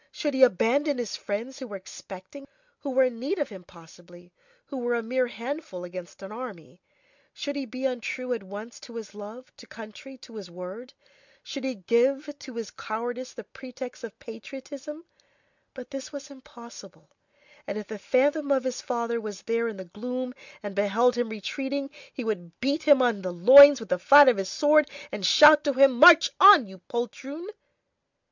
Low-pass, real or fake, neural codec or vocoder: 7.2 kHz; real; none